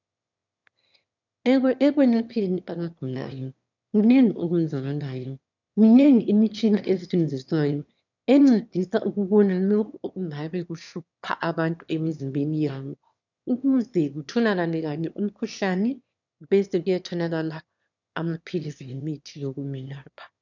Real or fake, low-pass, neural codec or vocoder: fake; 7.2 kHz; autoencoder, 22.05 kHz, a latent of 192 numbers a frame, VITS, trained on one speaker